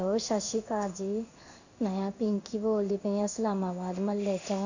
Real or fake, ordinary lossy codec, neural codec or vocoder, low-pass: fake; none; codec, 16 kHz in and 24 kHz out, 1 kbps, XY-Tokenizer; 7.2 kHz